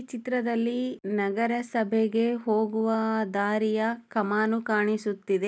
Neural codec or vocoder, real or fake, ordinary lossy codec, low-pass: none; real; none; none